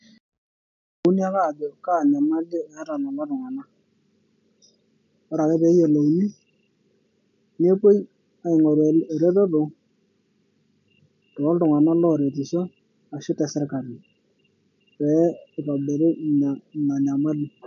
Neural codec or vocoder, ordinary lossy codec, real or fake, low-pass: none; AAC, 96 kbps; real; 7.2 kHz